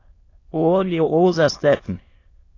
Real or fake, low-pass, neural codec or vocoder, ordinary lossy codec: fake; 7.2 kHz; autoencoder, 22.05 kHz, a latent of 192 numbers a frame, VITS, trained on many speakers; AAC, 32 kbps